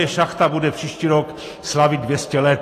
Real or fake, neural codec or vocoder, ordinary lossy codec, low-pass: real; none; AAC, 48 kbps; 14.4 kHz